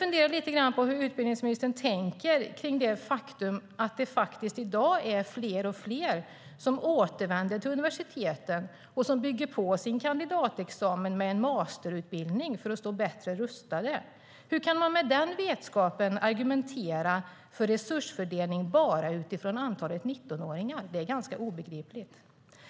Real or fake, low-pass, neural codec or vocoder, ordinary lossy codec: real; none; none; none